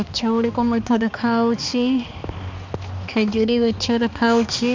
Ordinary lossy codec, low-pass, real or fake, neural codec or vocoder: MP3, 64 kbps; 7.2 kHz; fake; codec, 16 kHz, 2 kbps, X-Codec, HuBERT features, trained on balanced general audio